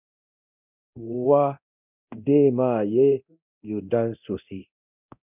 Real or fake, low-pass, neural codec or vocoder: fake; 3.6 kHz; codec, 24 kHz, 0.9 kbps, DualCodec